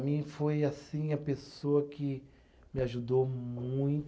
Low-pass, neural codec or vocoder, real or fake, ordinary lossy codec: none; none; real; none